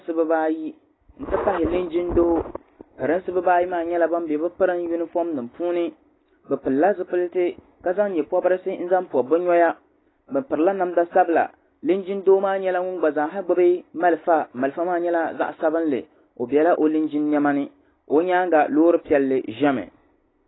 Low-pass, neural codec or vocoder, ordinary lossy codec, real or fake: 7.2 kHz; none; AAC, 16 kbps; real